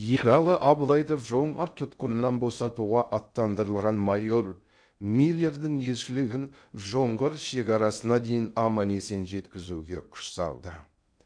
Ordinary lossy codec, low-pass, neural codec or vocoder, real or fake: MP3, 96 kbps; 9.9 kHz; codec, 16 kHz in and 24 kHz out, 0.6 kbps, FocalCodec, streaming, 2048 codes; fake